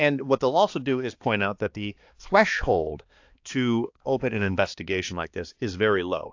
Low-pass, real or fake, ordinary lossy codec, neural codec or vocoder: 7.2 kHz; fake; AAC, 48 kbps; codec, 16 kHz, 2 kbps, X-Codec, HuBERT features, trained on balanced general audio